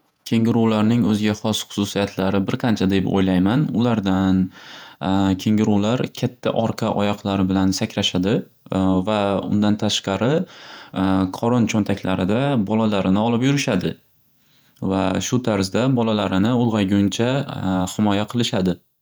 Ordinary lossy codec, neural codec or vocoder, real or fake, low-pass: none; vocoder, 48 kHz, 128 mel bands, Vocos; fake; none